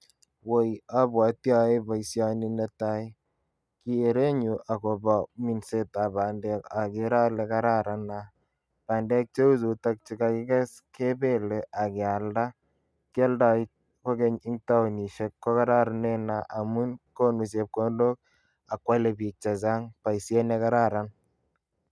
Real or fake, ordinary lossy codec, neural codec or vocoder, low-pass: real; none; none; none